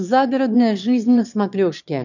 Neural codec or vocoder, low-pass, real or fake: autoencoder, 22.05 kHz, a latent of 192 numbers a frame, VITS, trained on one speaker; 7.2 kHz; fake